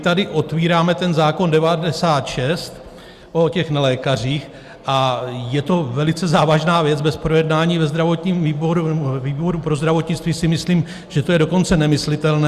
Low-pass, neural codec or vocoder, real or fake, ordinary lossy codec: 14.4 kHz; none; real; Opus, 64 kbps